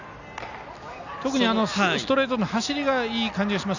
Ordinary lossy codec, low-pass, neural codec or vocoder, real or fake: none; 7.2 kHz; none; real